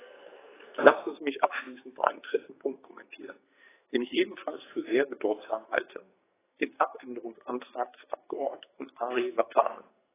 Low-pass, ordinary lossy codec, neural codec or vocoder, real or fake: 3.6 kHz; AAC, 16 kbps; codec, 44.1 kHz, 2.6 kbps, SNAC; fake